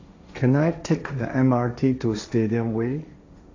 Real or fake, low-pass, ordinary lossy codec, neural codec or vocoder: fake; 7.2 kHz; AAC, 48 kbps; codec, 16 kHz, 1.1 kbps, Voila-Tokenizer